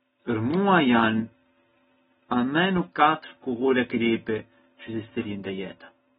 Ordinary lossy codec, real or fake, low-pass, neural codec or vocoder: AAC, 16 kbps; real; 10.8 kHz; none